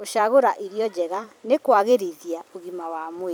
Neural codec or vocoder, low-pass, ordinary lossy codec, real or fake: vocoder, 44.1 kHz, 128 mel bands every 256 samples, BigVGAN v2; none; none; fake